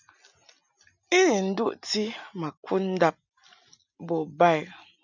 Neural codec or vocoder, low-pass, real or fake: none; 7.2 kHz; real